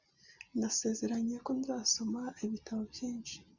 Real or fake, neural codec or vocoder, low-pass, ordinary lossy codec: real; none; 7.2 kHz; Opus, 64 kbps